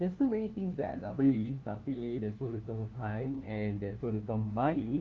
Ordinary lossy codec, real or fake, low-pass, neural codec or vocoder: Opus, 32 kbps; fake; 7.2 kHz; codec, 16 kHz, 1 kbps, FunCodec, trained on LibriTTS, 50 frames a second